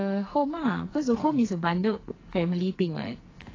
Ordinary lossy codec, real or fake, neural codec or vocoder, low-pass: AAC, 32 kbps; fake; codec, 44.1 kHz, 2.6 kbps, SNAC; 7.2 kHz